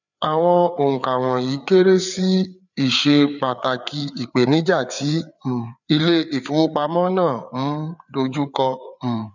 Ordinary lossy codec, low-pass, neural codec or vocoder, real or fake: none; 7.2 kHz; codec, 16 kHz, 4 kbps, FreqCodec, larger model; fake